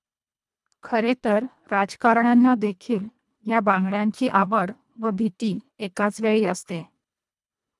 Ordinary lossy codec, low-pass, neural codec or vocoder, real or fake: none; none; codec, 24 kHz, 1.5 kbps, HILCodec; fake